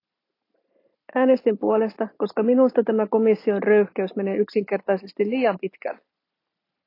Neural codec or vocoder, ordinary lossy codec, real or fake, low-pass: none; AAC, 32 kbps; real; 5.4 kHz